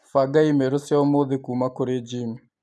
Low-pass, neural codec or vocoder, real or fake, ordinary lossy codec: none; none; real; none